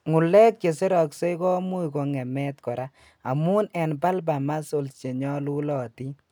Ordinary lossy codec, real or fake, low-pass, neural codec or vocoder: none; real; none; none